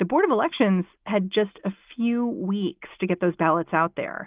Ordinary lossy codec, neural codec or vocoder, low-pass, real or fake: Opus, 32 kbps; none; 3.6 kHz; real